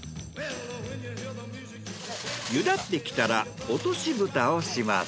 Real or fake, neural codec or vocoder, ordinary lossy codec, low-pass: real; none; none; none